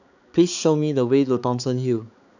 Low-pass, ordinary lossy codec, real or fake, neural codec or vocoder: 7.2 kHz; none; fake; codec, 16 kHz, 4 kbps, X-Codec, HuBERT features, trained on balanced general audio